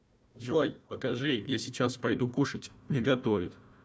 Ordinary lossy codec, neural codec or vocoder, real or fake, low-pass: none; codec, 16 kHz, 1 kbps, FunCodec, trained on Chinese and English, 50 frames a second; fake; none